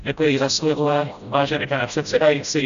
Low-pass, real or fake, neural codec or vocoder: 7.2 kHz; fake; codec, 16 kHz, 0.5 kbps, FreqCodec, smaller model